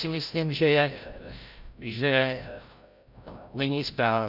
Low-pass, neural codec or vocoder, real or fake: 5.4 kHz; codec, 16 kHz, 0.5 kbps, FreqCodec, larger model; fake